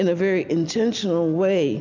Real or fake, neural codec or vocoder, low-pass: real; none; 7.2 kHz